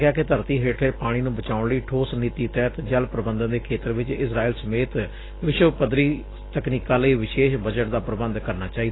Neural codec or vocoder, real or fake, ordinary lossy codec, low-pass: none; real; AAC, 16 kbps; 7.2 kHz